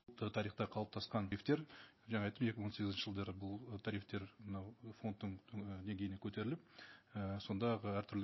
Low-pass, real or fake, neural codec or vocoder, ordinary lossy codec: 7.2 kHz; real; none; MP3, 24 kbps